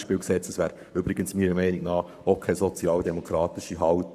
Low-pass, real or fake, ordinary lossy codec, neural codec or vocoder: 14.4 kHz; fake; none; codec, 44.1 kHz, 7.8 kbps, Pupu-Codec